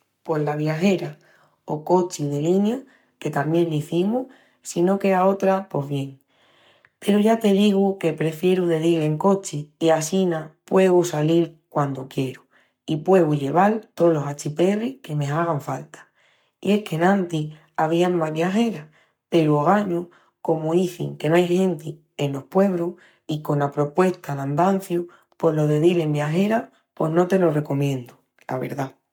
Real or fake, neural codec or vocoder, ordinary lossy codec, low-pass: fake; codec, 44.1 kHz, 7.8 kbps, Pupu-Codec; MP3, 96 kbps; 19.8 kHz